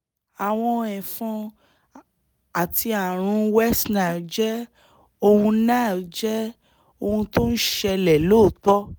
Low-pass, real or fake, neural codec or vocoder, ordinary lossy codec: none; real; none; none